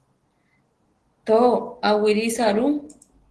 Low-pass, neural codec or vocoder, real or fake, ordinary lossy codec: 10.8 kHz; none; real; Opus, 16 kbps